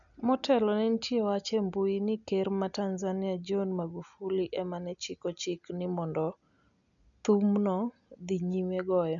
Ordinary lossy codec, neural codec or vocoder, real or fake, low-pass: none; none; real; 7.2 kHz